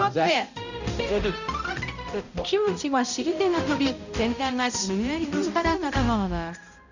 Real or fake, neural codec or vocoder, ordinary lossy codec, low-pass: fake; codec, 16 kHz, 0.5 kbps, X-Codec, HuBERT features, trained on balanced general audio; none; 7.2 kHz